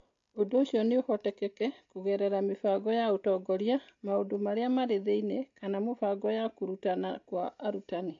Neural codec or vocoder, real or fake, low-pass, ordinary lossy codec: none; real; 7.2 kHz; AAC, 48 kbps